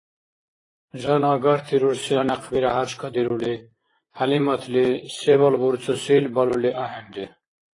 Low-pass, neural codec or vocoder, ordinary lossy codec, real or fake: 10.8 kHz; vocoder, 44.1 kHz, 128 mel bands, Pupu-Vocoder; AAC, 32 kbps; fake